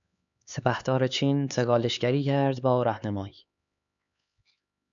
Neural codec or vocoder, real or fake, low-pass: codec, 16 kHz, 4 kbps, X-Codec, HuBERT features, trained on LibriSpeech; fake; 7.2 kHz